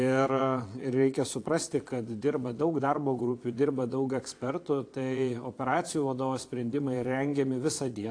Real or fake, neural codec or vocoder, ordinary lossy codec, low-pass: fake; vocoder, 22.05 kHz, 80 mel bands, Vocos; AAC, 48 kbps; 9.9 kHz